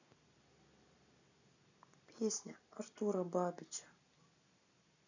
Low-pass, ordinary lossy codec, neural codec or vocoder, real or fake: 7.2 kHz; none; none; real